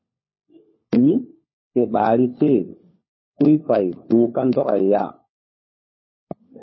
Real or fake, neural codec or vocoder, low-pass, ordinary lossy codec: fake; codec, 16 kHz, 4 kbps, FunCodec, trained on LibriTTS, 50 frames a second; 7.2 kHz; MP3, 24 kbps